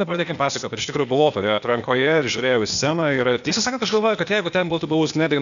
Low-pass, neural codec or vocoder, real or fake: 7.2 kHz; codec, 16 kHz, 0.8 kbps, ZipCodec; fake